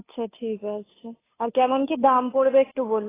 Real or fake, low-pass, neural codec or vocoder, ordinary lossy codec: fake; 3.6 kHz; codec, 16 kHz, 2 kbps, FunCodec, trained on Chinese and English, 25 frames a second; AAC, 16 kbps